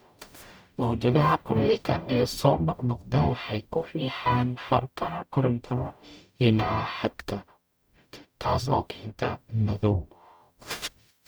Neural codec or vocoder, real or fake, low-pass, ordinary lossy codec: codec, 44.1 kHz, 0.9 kbps, DAC; fake; none; none